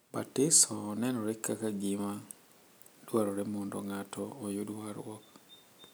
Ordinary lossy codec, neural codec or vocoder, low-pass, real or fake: none; none; none; real